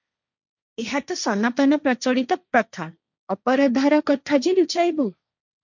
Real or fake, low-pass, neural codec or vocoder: fake; 7.2 kHz; codec, 16 kHz, 1.1 kbps, Voila-Tokenizer